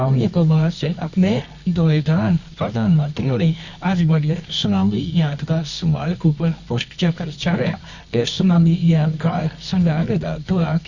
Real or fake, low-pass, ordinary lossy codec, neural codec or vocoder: fake; 7.2 kHz; none; codec, 24 kHz, 0.9 kbps, WavTokenizer, medium music audio release